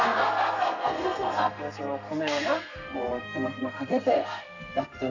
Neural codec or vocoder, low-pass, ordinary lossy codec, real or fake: codec, 32 kHz, 1.9 kbps, SNAC; 7.2 kHz; none; fake